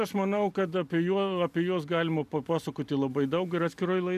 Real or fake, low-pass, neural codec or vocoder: real; 14.4 kHz; none